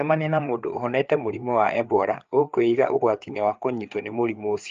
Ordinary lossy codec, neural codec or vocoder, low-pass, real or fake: Opus, 24 kbps; codec, 16 kHz, 4 kbps, FreqCodec, larger model; 7.2 kHz; fake